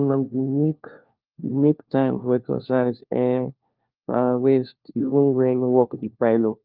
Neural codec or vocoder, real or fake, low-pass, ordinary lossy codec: codec, 16 kHz, 1 kbps, FunCodec, trained on LibriTTS, 50 frames a second; fake; 5.4 kHz; Opus, 24 kbps